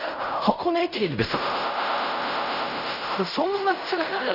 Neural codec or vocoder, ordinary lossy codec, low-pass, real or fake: codec, 16 kHz in and 24 kHz out, 0.4 kbps, LongCat-Audio-Codec, fine tuned four codebook decoder; none; 5.4 kHz; fake